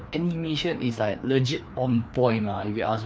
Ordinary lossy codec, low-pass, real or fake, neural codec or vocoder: none; none; fake; codec, 16 kHz, 2 kbps, FreqCodec, larger model